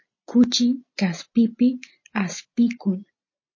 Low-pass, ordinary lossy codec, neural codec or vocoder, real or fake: 7.2 kHz; MP3, 32 kbps; none; real